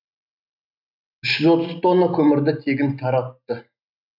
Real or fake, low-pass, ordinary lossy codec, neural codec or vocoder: real; 5.4 kHz; none; none